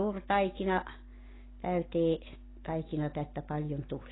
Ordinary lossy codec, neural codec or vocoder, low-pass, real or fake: AAC, 16 kbps; none; 7.2 kHz; real